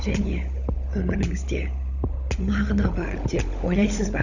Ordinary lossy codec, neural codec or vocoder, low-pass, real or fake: none; codec, 16 kHz, 16 kbps, FunCodec, trained on LibriTTS, 50 frames a second; 7.2 kHz; fake